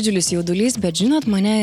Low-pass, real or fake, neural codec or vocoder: 19.8 kHz; real; none